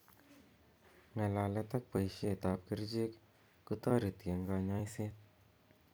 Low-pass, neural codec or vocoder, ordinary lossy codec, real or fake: none; vocoder, 44.1 kHz, 128 mel bands every 256 samples, BigVGAN v2; none; fake